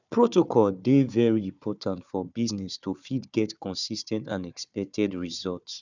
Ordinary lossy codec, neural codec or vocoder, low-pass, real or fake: none; codec, 16 kHz, 4 kbps, FunCodec, trained on Chinese and English, 50 frames a second; 7.2 kHz; fake